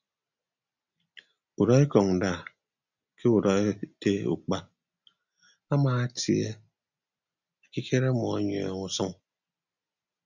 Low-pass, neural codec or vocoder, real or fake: 7.2 kHz; none; real